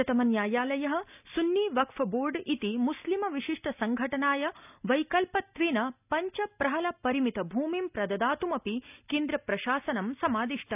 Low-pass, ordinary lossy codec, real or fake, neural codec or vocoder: 3.6 kHz; none; real; none